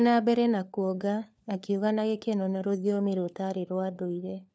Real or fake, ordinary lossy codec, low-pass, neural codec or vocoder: fake; none; none; codec, 16 kHz, 4 kbps, FunCodec, trained on LibriTTS, 50 frames a second